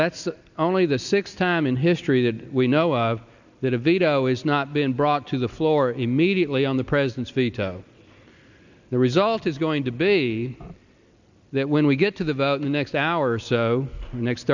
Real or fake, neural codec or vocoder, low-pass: real; none; 7.2 kHz